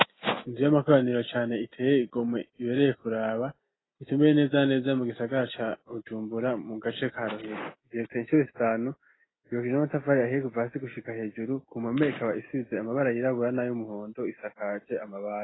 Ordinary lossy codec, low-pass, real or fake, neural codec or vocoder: AAC, 16 kbps; 7.2 kHz; real; none